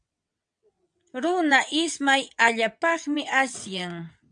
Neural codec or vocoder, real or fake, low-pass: vocoder, 22.05 kHz, 80 mel bands, WaveNeXt; fake; 9.9 kHz